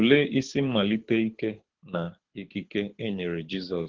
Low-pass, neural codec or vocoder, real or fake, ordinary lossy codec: 7.2 kHz; codec, 24 kHz, 6 kbps, HILCodec; fake; Opus, 16 kbps